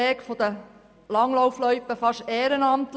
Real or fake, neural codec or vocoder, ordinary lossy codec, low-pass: real; none; none; none